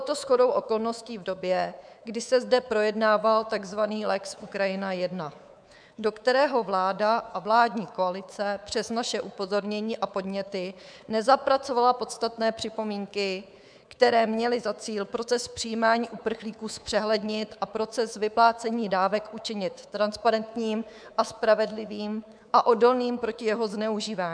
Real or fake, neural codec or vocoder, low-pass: fake; codec, 24 kHz, 3.1 kbps, DualCodec; 9.9 kHz